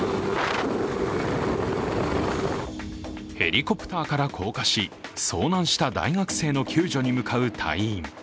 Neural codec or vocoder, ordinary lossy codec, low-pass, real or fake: none; none; none; real